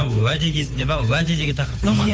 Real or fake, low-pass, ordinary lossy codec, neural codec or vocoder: fake; none; none; codec, 16 kHz, 8 kbps, FunCodec, trained on Chinese and English, 25 frames a second